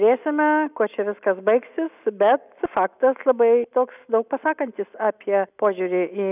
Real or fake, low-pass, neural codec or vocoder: real; 3.6 kHz; none